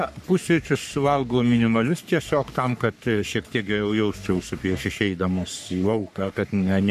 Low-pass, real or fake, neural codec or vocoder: 14.4 kHz; fake; codec, 44.1 kHz, 3.4 kbps, Pupu-Codec